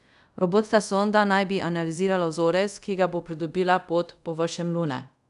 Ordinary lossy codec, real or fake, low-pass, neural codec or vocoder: none; fake; 10.8 kHz; codec, 24 kHz, 0.5 kbps, DualCodec